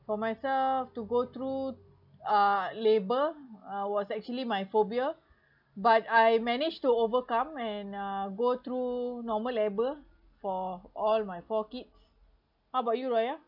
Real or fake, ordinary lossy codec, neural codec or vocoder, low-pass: real; none; none; 5.4 kHz